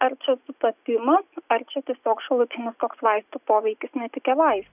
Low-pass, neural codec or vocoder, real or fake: 3.6 kHz; none; real